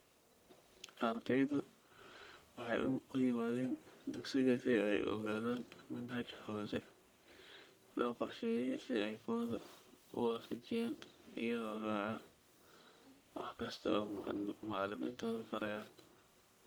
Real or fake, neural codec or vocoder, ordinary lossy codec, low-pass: fake; codec, 44.1 kHz, 1.7 kbps, Pupu-Codec; none; none